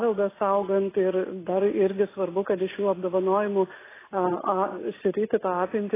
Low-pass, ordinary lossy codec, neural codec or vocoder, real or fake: 3.6 kHz; AAC, 16 kbps; none; real